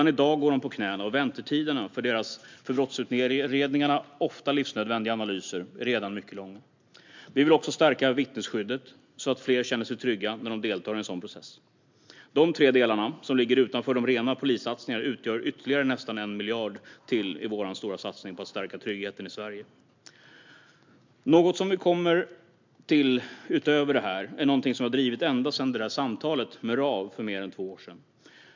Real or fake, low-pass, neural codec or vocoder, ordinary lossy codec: real; 7.2 kHz; none; MP3, 64 kbps